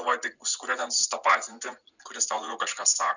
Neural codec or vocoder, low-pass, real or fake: vocoder, 44.1 kHz, 80 mel bands, Vocos; 7.2 kHz; fake